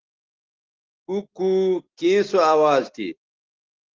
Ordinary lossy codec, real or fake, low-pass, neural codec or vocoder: Opus, 16 kbps; real; 7.2 kHz; none